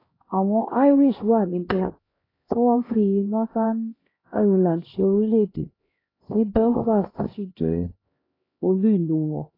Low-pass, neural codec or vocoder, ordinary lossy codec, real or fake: 5.4 kHz; codec, 16 kHz, 1 kbps, X-Codec, HuBERT features, trained on LibriSpeech; AAC, 24 kbps; fake